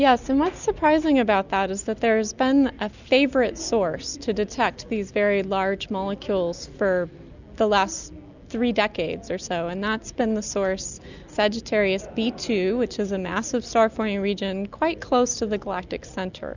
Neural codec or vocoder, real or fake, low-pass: none; real; 7.2 kHz